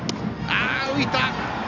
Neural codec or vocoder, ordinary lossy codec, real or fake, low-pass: none; none; real; 7.2 kHz